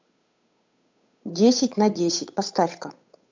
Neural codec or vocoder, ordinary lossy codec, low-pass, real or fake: codec, 16 kHz, 8 kbps, FunCodec, trained on Chinese and English, 25 frames a second; MP3, 64 kbps; 7.2 kHz; fake